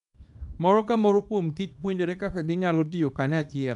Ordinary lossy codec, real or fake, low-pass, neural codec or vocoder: MP3, 96 kbps; fake; 10.8 kHz; codec, 24 kHz, 0.9 kbps, WavTokenizer, small release